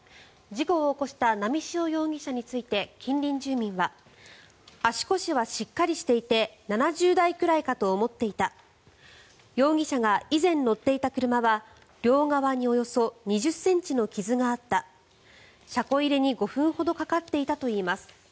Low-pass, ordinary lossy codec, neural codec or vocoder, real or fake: none; none; none; real